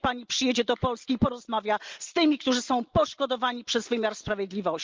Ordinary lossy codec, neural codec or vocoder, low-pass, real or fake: Opus, 32 kbps; none; 7.2 kHz; real